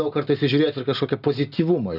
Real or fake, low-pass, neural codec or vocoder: real; 5.4 kHz; none